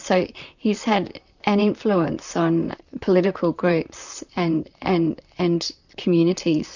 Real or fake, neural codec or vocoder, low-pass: fake; vocoder, 44.1 kHz, 128 mel bands, Pupu-Vocoder; 7.2 kHz